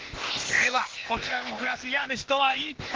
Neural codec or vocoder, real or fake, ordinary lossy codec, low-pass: codec, 16 kHz, 0.8 kbps, ZipCodec; fake; Opus, 16 kbps; 7.2 kHz